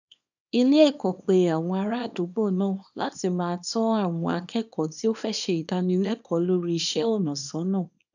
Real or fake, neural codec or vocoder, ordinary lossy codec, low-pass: fake; codec, 24 kHz, 0.9 kbps, WavTokenizer, small release; none; 7.2 kHz